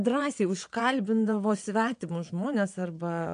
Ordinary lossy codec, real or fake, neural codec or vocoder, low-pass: MP3, 64 kbps; fake; vocoder, 22.05 kHz, 80 mel bands, WaveNeXt; 9.9 kHz